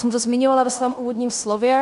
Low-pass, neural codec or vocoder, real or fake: 10.8 kHz; codec, 16 kHz in and 24 kHz out, 0.9 kbps, LongCat-Audio-Codec, fine tuned four codebook decoder; fake